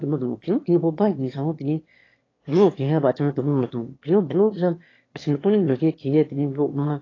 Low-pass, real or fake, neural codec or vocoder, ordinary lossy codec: 7.2 kHz; fake; autoencoder, 22.05 kHz, a latent of 192 numbers a frame, VITS, trained on one speaker; AAC, 32 kbps